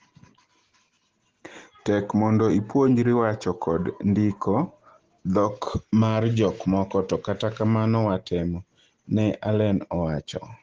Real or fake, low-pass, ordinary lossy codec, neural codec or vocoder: real; 7.2 kHz; Opus, 16 kbps; none